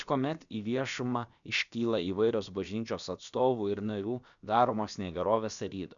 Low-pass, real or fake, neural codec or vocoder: 7.2 kHz; fake; codec, 16 kHz, about 1 kbps, DyCAST, with the encoder's durations